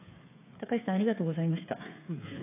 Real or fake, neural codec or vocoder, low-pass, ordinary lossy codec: fake; codec, 16 kHz, 8 kbps, FreqCodec, smaller model; 3.6 kHz; AAC, 24 kbps